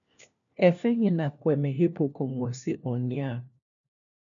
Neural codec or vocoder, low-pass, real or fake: codec, 16 kHz, 1 kbps, FunCodec, trained on LibriTTS, 50 frames a second; 7.2 kHz; fake